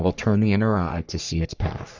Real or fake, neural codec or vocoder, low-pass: fake; codec, 44.1 kHz, 3.4 kbps, Pupu-Codec; 7.2 kHz